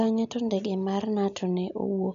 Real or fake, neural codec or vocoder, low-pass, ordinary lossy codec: real; none; 7.2 kHz; none